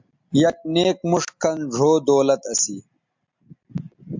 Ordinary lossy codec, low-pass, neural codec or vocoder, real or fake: MP3, 64 kbps; 7.2 kHz; none; real